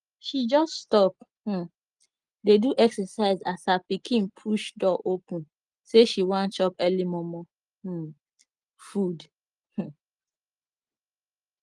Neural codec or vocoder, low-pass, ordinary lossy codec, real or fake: none; 10.8 kHz; Opus, 24 kbps; real